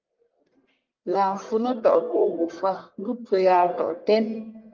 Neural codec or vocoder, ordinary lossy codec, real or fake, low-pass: codec, 44.1 kHz, 1.7 kbps, Pupu-Codec; Opus, 24 kbps; fake; 7.2 kHz